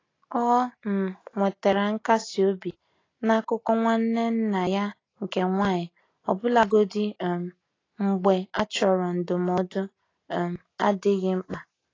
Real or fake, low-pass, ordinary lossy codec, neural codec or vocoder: real; 7.2 kHz; AAC, 32 kbps; none